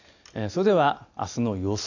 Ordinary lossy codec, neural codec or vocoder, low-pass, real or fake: none; none; 7.2 kHz; real